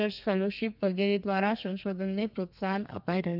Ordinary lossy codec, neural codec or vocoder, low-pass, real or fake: none; codec, 32 kHz, 1.9 kbps, SNAC; 5.4 kHz; fake